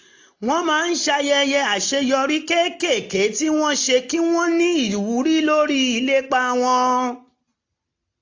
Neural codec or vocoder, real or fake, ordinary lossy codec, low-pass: none; real; AAC, 48 kbps; 7.2 kHz